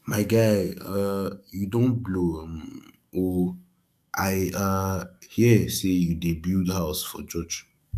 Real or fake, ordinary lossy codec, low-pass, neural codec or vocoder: fake; none; 14.4 kHz; codec, 44.1 kHz, 7.8 kbps, DAC